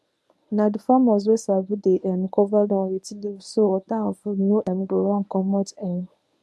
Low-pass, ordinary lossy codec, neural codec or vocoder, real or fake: none; none; codec, 24 kHz, 0.9 kbps, WavTokenizer, medium speech release version 1; fake